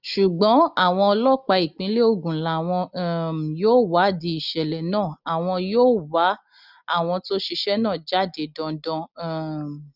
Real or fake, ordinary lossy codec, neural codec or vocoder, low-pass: real; none; none; 5.4 kHz